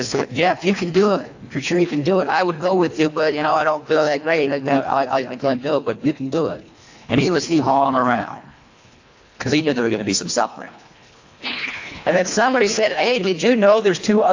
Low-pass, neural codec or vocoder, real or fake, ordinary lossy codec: 7.2 kHz; codec, 24 kHz, 1.5 kbps, HILCodec; fake; AAC, 48 kbps